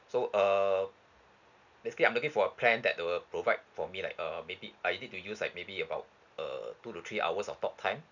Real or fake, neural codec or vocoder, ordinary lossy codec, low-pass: real; none; none; 7.2 kHz